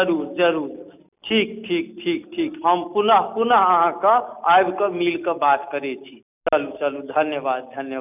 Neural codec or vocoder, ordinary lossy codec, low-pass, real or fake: none; none; 3.6 kHz; real